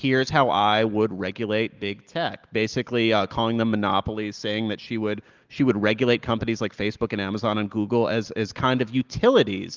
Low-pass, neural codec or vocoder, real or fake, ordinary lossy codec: 7.2 kHz; none; real; Opus, 32 kbps